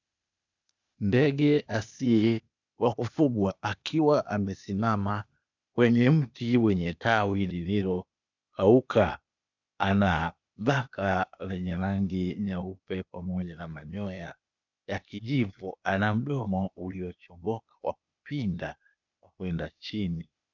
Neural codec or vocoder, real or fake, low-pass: codec, 16 kHz, 0.8 kbps, ZipCodec; fake; 7.2 kHz